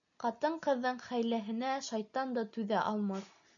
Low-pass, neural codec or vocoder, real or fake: 7.2 kHz; none; real